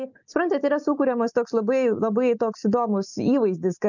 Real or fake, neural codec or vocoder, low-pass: real; none; 7.2 kHz